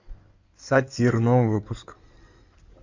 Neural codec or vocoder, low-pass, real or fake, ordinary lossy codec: codec, 16 kHz in and 24 kHz out, 2.2 kbps, FireRedTTS-2 codec; 7.2 kHz; fake; Opus, 64 kbps